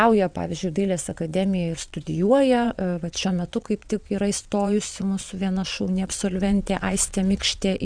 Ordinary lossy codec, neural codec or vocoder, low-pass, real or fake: Opus, 64 kbps; vocoder, 22.05 kHz, 80 mel bands, WaveNeXt; 9.9 kHz; fake